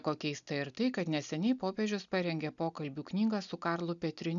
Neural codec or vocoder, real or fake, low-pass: none; real; 7.2 kHz